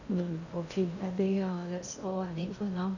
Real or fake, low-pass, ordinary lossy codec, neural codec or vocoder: fake; 7.2 kHz; none; codec, 16 kHz in and 24 kHz out, 0.6 kbps, FocalCodec, streaming, 2048 codes